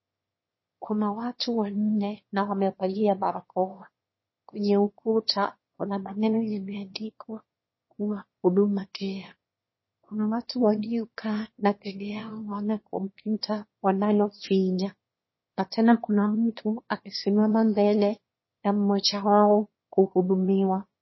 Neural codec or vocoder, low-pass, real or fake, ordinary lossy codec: autoencoder, 22.05 kHz, a latent of 192 numbers a frame, VITS, trained on one speaker; 7.2 kHz; fake; MP3, 24 kbps